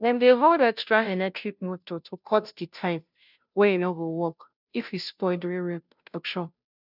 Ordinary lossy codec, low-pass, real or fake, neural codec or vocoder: none; 5.4 kHz; fake; codec, 16 kHz, 0.5 kbps, FunCodec, trained on Chinese and English, 25 frames a second